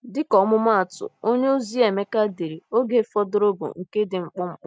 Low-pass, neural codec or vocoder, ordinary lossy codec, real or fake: none; none; none; real